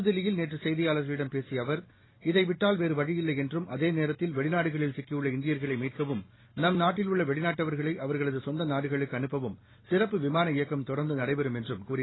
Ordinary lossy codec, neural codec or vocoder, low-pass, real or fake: AAC, 16 kbps; none; 7.2 kHz; real